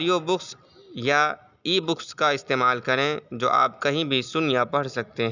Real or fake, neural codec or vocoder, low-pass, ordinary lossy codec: real; none; 7.2 kHz; none